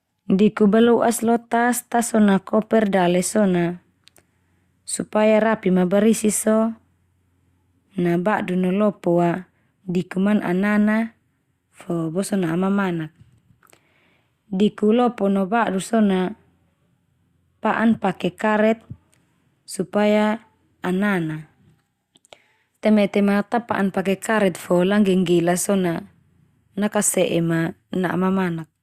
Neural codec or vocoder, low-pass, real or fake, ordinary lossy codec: none; 14.4 kHz; real; Opus, 64 kbps